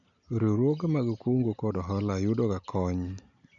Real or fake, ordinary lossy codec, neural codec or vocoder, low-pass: real; none; none; 7.2 kHz